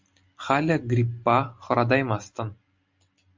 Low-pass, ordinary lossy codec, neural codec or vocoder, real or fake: 7.2 kHz; MP3, 64 kbps; none; real